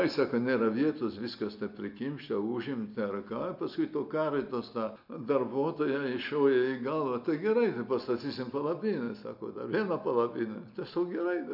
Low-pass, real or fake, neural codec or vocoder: 5.4 kHz; real; none